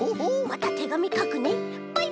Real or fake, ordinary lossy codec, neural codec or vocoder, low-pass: real; none; none; none